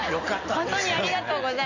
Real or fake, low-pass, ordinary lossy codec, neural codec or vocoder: real; 7.2 kHz; none; none